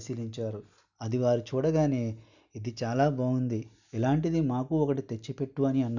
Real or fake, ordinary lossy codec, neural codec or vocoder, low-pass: real; none; none; 7.2 kHz